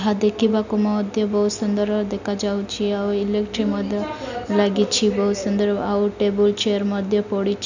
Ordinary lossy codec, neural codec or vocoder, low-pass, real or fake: none; none; 7.2 kHz; real